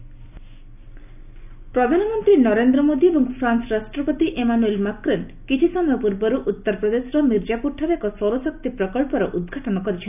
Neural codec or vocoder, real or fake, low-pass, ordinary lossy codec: none; real; 3.6 kHz; none